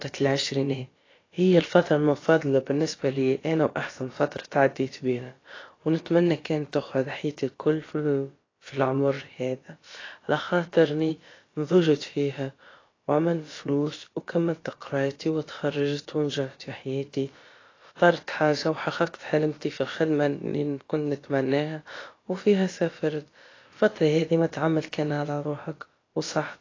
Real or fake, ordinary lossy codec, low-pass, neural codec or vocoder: fake; AAC, 32 kbps; 7.2 kHz; codec, 16 kHz, about 1 kbps, DyCAST, with the encoder's durations